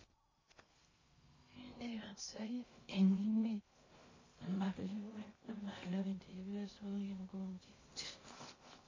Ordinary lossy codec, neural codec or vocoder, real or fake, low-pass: MP3, 32 kbps; codec, 16 kHz in and 24 kHz out, 0.6 kbps, FocalCodec, streaming, 2048 codes; fake; 7.2 kHz